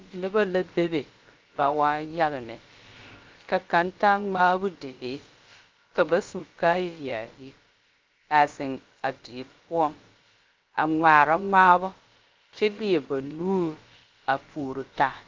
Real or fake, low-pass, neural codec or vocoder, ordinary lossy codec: fake; 7.2 kHz; codec, 16 kHz, about 1 kbps, DyCAST, with the encoder's durations; Opus, 32 kbps